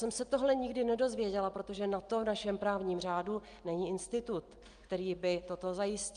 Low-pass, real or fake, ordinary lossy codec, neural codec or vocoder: 9.9 kHz; real; Opus, 32 kbps; none